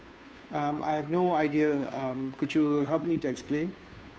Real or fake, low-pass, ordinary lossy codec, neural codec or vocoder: fake; none; none; codec, 16 kHz, 2 kbps, FunCodec, trained on Chinese and English, 25 frames a second